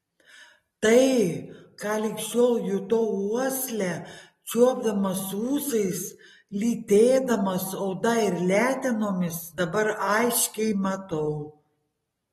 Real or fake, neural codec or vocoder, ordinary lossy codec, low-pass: real; none; AAC, 32 kbps; 19.8 kHz